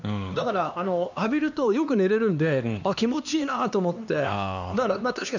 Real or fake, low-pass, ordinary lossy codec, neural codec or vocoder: fake; 7.2 kHz; none; codec, 16 kHz, 2 kbps, X-Codec, HuBERT features, trained on LibriSpeech